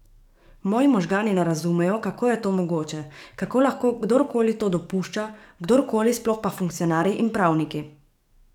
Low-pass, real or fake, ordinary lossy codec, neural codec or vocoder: 19.8 kHz; fake; none; codec, 44.1 kHz, 7.8 kbps, DAC